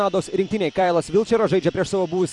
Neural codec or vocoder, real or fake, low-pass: vocoder, 44.1 kHz, 128 mel bands every 256 samples, BigVGAN v2; fake; 10.8 kHz